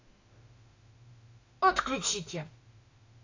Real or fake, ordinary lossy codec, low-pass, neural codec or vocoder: fake; AAC, 48 kbps; 7.2 kHz; autoencoder, 48 kHz, 32 numbers a frame, DAC-VAE, trained on Japanese speech